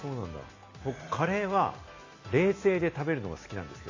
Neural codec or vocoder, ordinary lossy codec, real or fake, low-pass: none; AAC, 32 kbps; real; 7.2 kHz